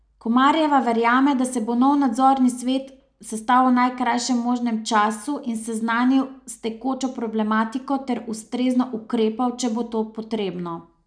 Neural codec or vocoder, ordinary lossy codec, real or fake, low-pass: none; none; real; 9.9 kHz